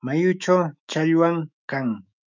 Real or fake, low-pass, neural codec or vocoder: fake; 7.2 kHz; autoencoder, 48 kHz, 128 numbers a frame, DAC-VAE, trained on Japanese speech